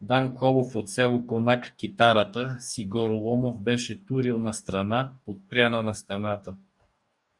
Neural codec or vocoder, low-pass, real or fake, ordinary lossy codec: codec, 44.1 kHz, 2.6 kbps, DAC; 10.8 kHz; fake; Opus, 64 kbps